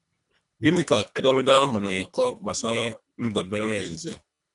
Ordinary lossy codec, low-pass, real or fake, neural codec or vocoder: none; 10.8 kHz; fake; codec, 24 kHz, 1.5 kbps, HILCodec